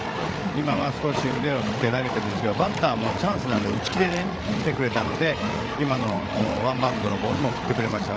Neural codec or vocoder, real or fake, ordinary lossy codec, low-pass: codec, 16 kHz, 8 kbps, FreqCodec, larger model; fake; none; none